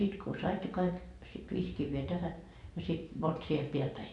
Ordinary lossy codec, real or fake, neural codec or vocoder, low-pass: none; fake; vocoder, 44.1 kHz, 128 mel bands every 512 samples, BigVGAN v2; 10.8 kHz